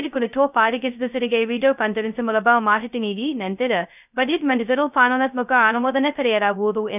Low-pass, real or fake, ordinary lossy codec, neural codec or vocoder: 3.6 kHz; fake; none; codec, 16 kHz, 0.2 kbps, FocalCodec